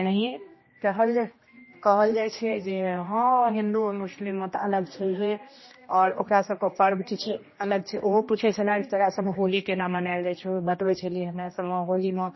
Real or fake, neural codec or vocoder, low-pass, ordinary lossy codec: fake; codec, 16 kHz, 1 kbps, X-Codec, HuBERT features, trained on general audio; 7.2 kHz; MP3, 24 kbps